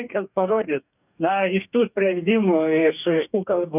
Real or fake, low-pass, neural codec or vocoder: fake; 3.6 kHz; codec, 44.1 kHz, 2.6 kbps, DAC